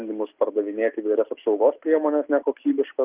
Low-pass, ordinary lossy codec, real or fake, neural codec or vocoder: 3.6 kHz; Opus, 32 kbps; real; none